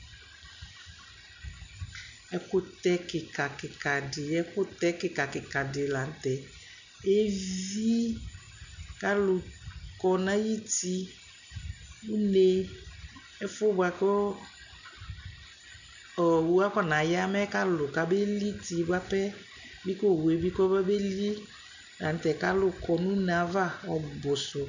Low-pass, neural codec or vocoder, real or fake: 7.2 kHz; none; real